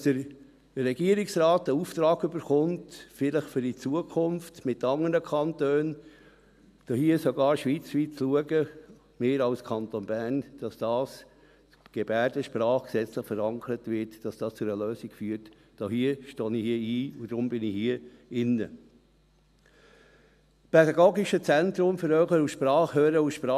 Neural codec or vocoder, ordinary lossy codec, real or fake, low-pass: none; none; real; 14.4 kHz